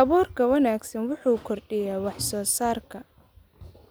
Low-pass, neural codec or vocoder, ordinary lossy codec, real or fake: none; none; none; real